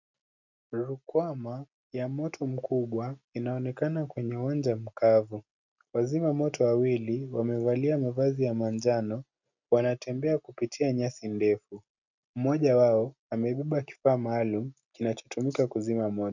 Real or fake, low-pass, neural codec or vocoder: real; 7.2 kHz; none